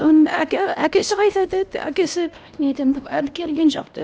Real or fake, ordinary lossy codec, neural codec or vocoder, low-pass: fake; none; codec, 16 kHz, 1 kbps, X-Codec, HuBERT features, trained on LibriSpeech; none